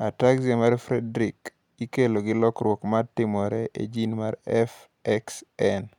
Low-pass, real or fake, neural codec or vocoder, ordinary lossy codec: 19.8 kHz; real; none; none